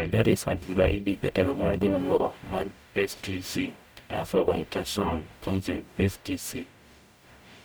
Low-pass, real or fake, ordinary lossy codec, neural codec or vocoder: none; fake; none; codec, 44.1 kHz, 0.9 kbps, DAC